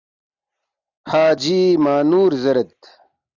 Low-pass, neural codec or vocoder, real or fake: 7.2 kHz; none; real